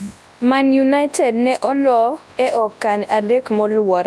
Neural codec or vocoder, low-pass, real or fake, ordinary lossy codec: codec, 24 kHz, 0.9 kbps, WavTokenizer, large speech release; none; fake; none